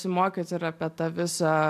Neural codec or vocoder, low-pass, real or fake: none; 14.4 kHz; real